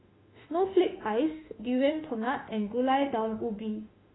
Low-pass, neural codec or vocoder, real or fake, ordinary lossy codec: 7.2 kHz; autoencoder, 48 kHz, 32 numbers a frame, DAC-VAE, trained on Japanese speech; fake; AAC, 16 kbps